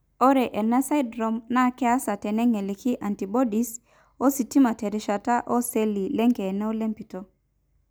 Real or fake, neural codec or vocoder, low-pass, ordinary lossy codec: real; none; none; none